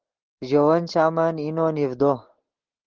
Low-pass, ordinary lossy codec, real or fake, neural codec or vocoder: 7.2 kHz; Opus, 16 kbps; real; none